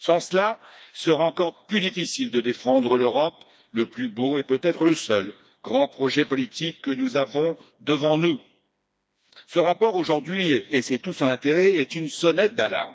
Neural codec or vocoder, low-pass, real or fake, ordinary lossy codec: codec, 16 kHz, 2 kbps, FreqCodec, smaller model; none; fake; none